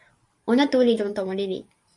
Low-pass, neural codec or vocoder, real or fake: 10.8 kHz; vocoder, 44.1 kHz, 128 mel bands every 512 samples, BigVGAN v2; fake